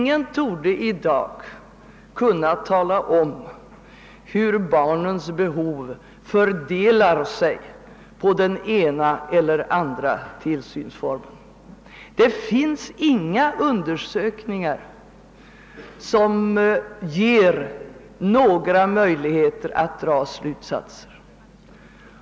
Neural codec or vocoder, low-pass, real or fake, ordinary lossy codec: none; none; real; none